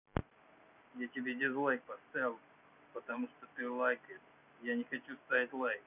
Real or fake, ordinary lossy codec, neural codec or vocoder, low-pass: real; none; none; 3.6 kHz